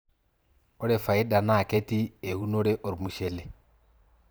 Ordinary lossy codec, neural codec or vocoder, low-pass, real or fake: none; vocoder, 44.1 kHz, 128 mel bands every 512 samples, BigVGAN v2; none; fake